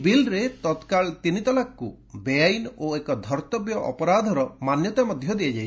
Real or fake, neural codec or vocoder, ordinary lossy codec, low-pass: real; none; none; none